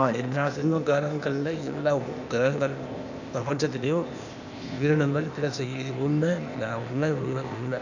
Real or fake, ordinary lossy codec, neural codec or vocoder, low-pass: fake; none; codec, 16 kHz, 0.8 kbps, ZipCodec; 7.2 kHz